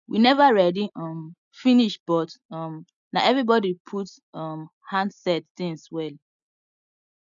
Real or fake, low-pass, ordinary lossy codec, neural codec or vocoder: real; 7.2 kHz; none; none